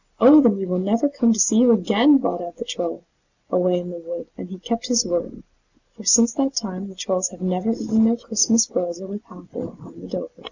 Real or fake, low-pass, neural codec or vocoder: real; 7.2 kHz; none